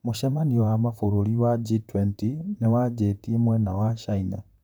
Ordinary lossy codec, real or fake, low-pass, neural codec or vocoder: none; fake; none; vocoder, 44.1 kHz, 128 mel bands, Pupu-Vocoder